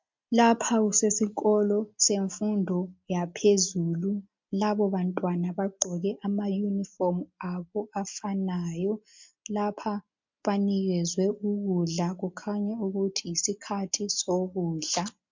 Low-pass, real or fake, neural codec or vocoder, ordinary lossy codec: 7.2 kHz; real; none; MP3, 64 kbps